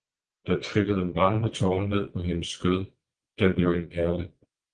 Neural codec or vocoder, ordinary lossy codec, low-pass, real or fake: vocoder, 22.05 kHz, 80 mel bands, WaveNeXt; Opus, 24 kbps; 9.9 kHz; fake